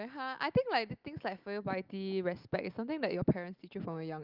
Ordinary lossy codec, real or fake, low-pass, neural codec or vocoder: Opus, 24 kbps; real; 5.4 kHz; none